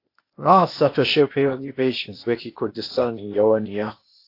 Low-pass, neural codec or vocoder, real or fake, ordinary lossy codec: 5.4 kHz; codec, 16 kHz, 0.8 kbps, ZipCodec; fake; AAC, 32 kbps